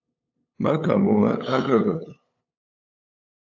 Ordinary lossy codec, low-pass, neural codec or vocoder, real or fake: AAC, 48 kbps; 7.2 kHz; codec, 16 kHz, 8 kbps, FunCodec, trained on LibriTTS, 25 frames a second; fake